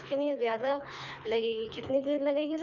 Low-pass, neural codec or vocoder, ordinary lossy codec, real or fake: 7.2 kHz; codec, 24 kHz, 3 kbps, HILCodec; none; fake